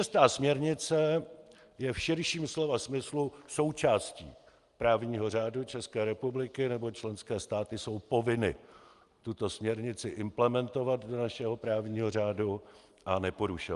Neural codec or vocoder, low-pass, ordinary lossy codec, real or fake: none; 10.8 kHz; Opus, 32 kbps; real